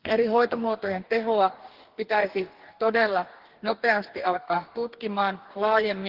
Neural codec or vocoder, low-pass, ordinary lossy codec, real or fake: codec, 44.1 kHz, 2.6 kbps, DAC; 5.4 kHz; Opus, 16 kbps; fake